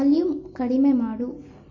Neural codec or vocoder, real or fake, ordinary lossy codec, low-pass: none; real; MP3, 32 kbps; 7.2 kHz